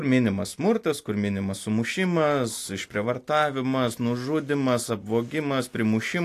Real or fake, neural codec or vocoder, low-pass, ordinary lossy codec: real; none; 14.4 kHz; AAC, 64 kbps